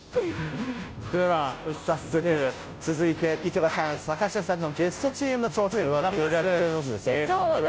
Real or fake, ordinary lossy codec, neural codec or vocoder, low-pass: fake; none; codec, 16 kHz, 0.5 kbps, FunCodec, trained on Chinese and English, 25 frames a second; none